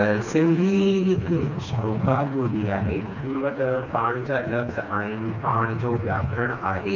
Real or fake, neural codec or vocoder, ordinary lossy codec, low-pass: fake; codec, 16 kHz, 2 kbps, FreqCodec, smaller model; none; 7.2 kHz